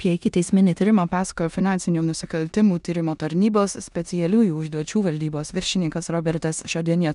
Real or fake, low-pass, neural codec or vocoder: fake; 10.8 kHz; codec, 16 kHz in and 24 kHz out, 0.9 kbps, LongCat-Audio-Codec, four codebook decoder